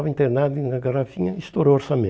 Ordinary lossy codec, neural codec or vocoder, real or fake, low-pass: none; none; real; none